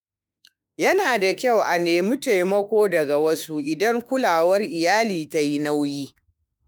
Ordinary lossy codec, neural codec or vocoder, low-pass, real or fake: none; autoencoder, 48 kHz, 32 numbers a frame, DAC-VAE, trained on Japanese speech; none; fake